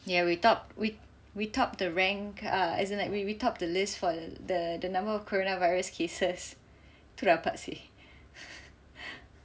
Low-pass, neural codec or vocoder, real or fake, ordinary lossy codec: none; none; real; none